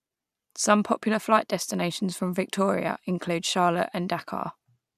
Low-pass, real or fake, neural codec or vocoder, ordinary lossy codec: 14.4 kHz; real; none; none